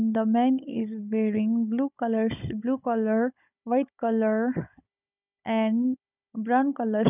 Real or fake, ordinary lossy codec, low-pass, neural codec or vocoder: fake; none; 3.6 kHz; codec, 16 kHz, 16 kbps, FunCodec, trained on Chinese and English, 50 frames a second